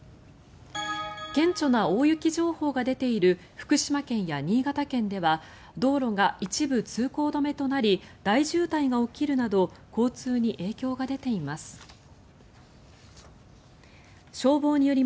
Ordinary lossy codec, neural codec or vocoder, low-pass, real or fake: none; none; none; real